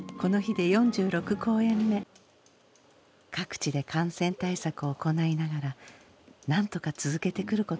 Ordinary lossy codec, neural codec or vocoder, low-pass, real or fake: none; none; none; real